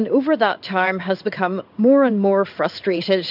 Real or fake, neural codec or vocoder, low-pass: fake; codec, 16 kHz in and 24 kHz out, 1 kbps, XY-Tokenizer; 5.4 kHz